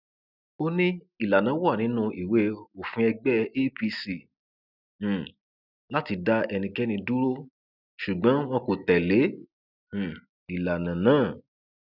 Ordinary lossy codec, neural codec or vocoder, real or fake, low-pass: none; none; real; 5.4 kHz